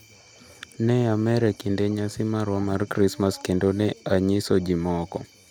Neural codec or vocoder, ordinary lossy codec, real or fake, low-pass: none; none; real; none